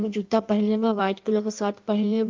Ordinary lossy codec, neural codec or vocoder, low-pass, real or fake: Opus, 24 kbps; codec, 16 kHz in and 24 kHz out, 1.1 kbps, FireRedTTS-2 codec; 7.2 kHz; fake